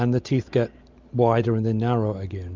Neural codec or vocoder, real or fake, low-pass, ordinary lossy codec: none; real; 7.2 kHz; MP3, 64 kbps